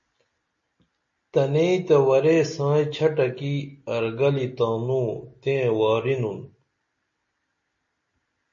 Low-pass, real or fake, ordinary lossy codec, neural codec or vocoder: 7.2 kHz; real; MP3, 32 kbps; none